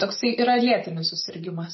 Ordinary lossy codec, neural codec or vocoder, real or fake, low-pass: MP3, 24 kbps; none; real; 7.2 kHz